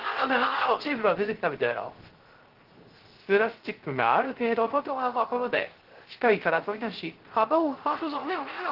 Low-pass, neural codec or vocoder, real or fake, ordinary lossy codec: 5.4 kHz; codec, 16 kHz, 0.3 kbps, FocalCodec; fake; Opus, 16 kbps